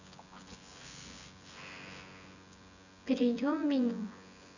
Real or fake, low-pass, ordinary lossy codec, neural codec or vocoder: fake; 7.2 kHz; none; vocoder, 24 kHz, 100 mel bands, Vocos